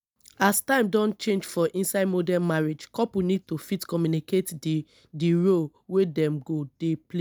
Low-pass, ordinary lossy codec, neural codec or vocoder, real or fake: none; none; none; real